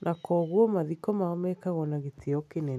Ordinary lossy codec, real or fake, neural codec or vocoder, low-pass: none; real; none; 14.4 kHz